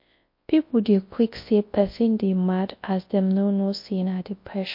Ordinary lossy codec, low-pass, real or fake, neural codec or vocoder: MP3, 32 kbps; 5.4 kHz; fake; codec, 24 kHz, 0.9 kbps, WavTokenizer, large speech release